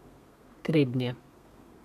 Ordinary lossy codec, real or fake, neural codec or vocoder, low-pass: MP3, 96 kbps; fake; codec, 32 kHz, 1.9 kbps, SNAC; 14.4 kHz